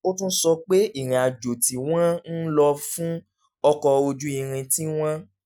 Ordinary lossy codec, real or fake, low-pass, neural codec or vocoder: none; real; none; none